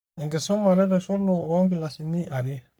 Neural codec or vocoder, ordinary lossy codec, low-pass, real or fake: codec, 44.1 kHz, 3.4 kbps, Pupu-Codec; none; none; fake